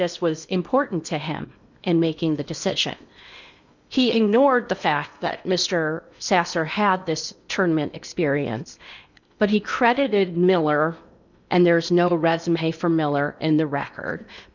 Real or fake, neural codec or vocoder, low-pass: fake; codec, 16 kHz in and 24 kHz out, 0.8 kbps, FocalCodec, streaming, 65536 codes; 7.2 kHz